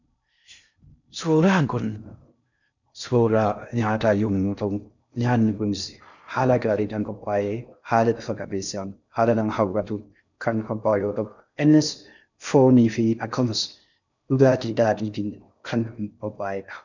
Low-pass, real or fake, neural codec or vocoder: 7.2 kHz; fake; codec, 16 kHz in and 24 kHz out, 0.6 kbps, FocalCodec, streaming, 4096 codes